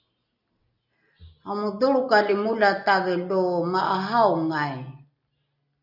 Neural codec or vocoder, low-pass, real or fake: none; 5.4 kHz; real